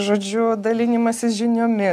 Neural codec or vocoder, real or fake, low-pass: none; real; 14.4 kHz